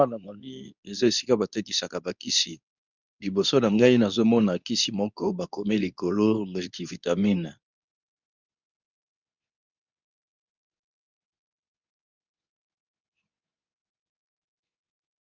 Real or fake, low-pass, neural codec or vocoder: fake; 7.2 kHz; codec, 24 kHz, 0.9 kbps, WavTokenizer, medium speech release version 2